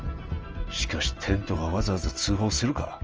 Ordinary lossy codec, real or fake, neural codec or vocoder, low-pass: Opus, 24 kbps; real; none; 7.2 kHz